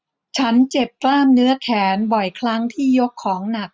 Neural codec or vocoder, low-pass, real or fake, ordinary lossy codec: none; none; real; none